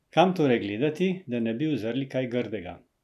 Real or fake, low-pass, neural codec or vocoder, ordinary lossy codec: real; 14.4 kHz; none; none